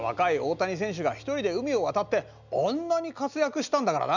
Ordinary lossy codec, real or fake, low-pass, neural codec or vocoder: none; real; 7.2 kHz; none